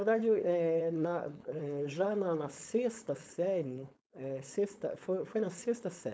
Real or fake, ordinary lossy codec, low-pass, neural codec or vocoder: fake; none; none; codec, 16 kHz, 4.8 kbps, FACodec